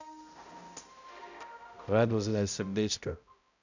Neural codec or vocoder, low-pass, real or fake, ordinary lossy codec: codec, 16 kHz, 0.5 kbps, X-Codec, HuBERT features, trained on balanced general audio; 7.2 kHz; fake; none